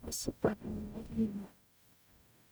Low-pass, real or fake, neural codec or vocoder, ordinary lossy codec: none; fake; codec, 44.1 kHz, 0.9 kbps, DAC; none